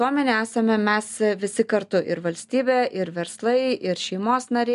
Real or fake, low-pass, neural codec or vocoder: real; 10.8 kHz; none